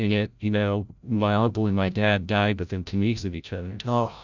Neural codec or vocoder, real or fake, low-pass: codec, 16 kHz, 0.5 kbps, FreqCodec, larger model; fake; 7.2 kHz